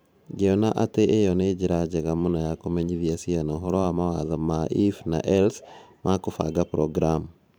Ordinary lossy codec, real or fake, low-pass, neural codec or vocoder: none; fake; none; vocoder, 44.1 kHz, 128 mel bands every 512 samples, BigVGAN v2